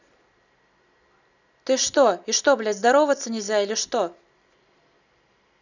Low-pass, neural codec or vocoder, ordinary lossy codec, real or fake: 7.2 kHz; none; none; real